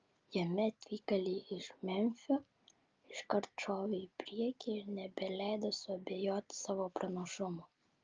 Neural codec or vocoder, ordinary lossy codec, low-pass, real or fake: none; Opus, 24 kbps; 7.2 kHz; real